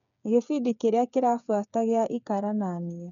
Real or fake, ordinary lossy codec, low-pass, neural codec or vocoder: fake; none; 7.2 kHz; codec, 16 kHz, 8 kbps, FreqCodec, smaller model